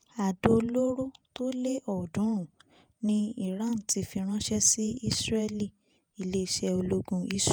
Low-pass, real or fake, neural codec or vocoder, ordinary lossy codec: none; fake; vocoder, 48 kHz, 128 mel bands, Vocos; none